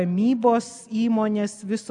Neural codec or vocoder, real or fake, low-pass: none; real; 10.8 kHz